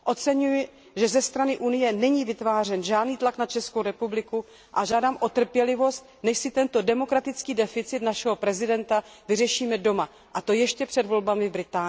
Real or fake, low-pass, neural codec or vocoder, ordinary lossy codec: real; none; none; none